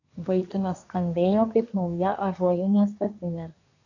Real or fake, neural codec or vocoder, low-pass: fake; codec, 24 kHz, 1 kbps, SNAC; 7.2 kHz